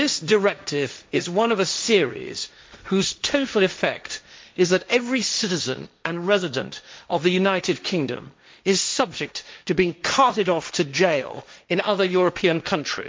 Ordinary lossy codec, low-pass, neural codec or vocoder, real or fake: none; none; codec, 16 kHz, 1.1 kbps, Voila-Tokenizer; fake